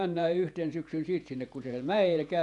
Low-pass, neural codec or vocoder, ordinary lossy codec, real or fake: 10.8 kHz; vocoder, 48 kHz, 128 mel bands, Vocos; none; fake